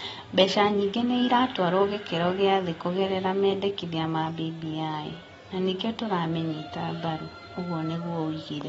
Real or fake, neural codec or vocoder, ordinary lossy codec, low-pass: real; none; AAC, 24 kbps; 19.8 kHz